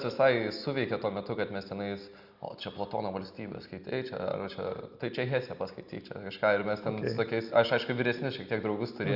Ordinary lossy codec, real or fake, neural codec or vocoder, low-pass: Opus, 64 kbps; real; none; 5.4 kHz